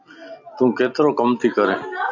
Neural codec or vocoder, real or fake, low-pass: none; real; 7.2 kHz